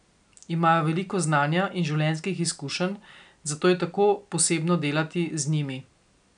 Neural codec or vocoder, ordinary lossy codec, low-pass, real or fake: none; none; 9.9 kHz; real